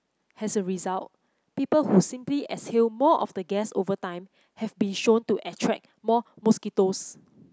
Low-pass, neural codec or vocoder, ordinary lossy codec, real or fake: none; none; none; real